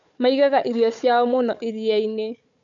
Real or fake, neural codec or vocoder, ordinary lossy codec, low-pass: fake; codec, 16 kHz, 4 kbps, FunCodec, trained on Chinese and English, 50 frames a second; none; 7.2 kHz